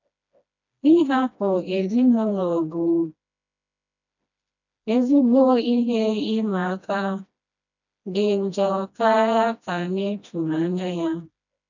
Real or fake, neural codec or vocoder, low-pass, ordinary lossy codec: fake; codec, 16 kHz, 1 kbps, FreqCodec, smaller model; 7.2 kHz; none